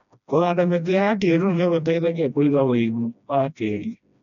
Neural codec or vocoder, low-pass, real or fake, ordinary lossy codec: codec, 16 kHz, 1 kbps, FreqCodec, smaller model; 7.2 kHz; fake; none